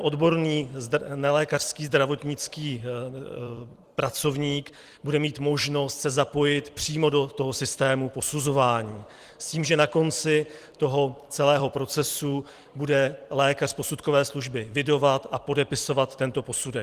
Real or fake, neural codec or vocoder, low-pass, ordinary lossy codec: fake; vocoder, 44.1 kHz, 128 mel bands every 256 samples, BigVGAN v2; 14.4 kHz; Opus, 24 kbps